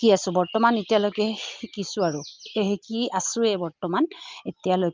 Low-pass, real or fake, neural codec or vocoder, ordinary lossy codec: 7.2 kHz; real; none; Opus, 24 kbps